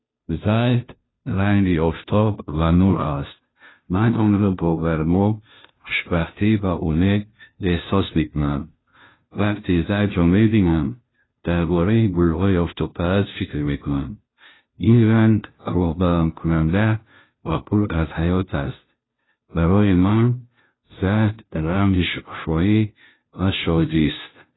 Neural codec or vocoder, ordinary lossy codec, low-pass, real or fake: codec, 16 kHz, 0.5 kbps, FunCodec, trained on Chinese and English, 25 frames a second; AAC, 16 kbps; 7.2 kHz; fake